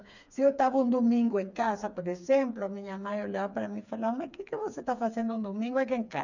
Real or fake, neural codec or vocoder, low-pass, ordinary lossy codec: fake; codec, 16 kHz, 4 kbps, FreqCodec, smaller model; 7.2 kHz; none